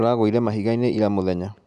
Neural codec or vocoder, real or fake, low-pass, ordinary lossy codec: none; real; 10.8 kHz; none